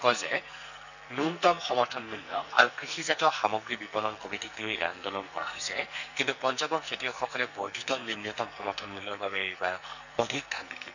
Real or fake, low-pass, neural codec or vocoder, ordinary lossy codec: fake; 7.2 kHz; codec, 44.1 kHz, 2.6 kbps, SNAC; none